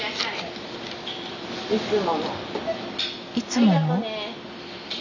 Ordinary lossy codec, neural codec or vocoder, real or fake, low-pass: none; none; real; 7.2 kHz